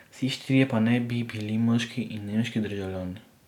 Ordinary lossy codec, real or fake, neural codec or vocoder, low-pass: none; real; none; 19.8 kHz